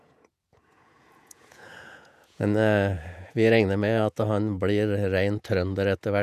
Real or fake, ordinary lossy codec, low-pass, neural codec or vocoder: fake; none; 14.4 kHz; vocoder, 48 kHz, 128 mel bands, Vocos